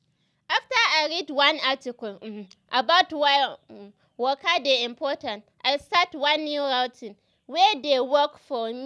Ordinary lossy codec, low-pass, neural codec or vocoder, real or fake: none; none; none; real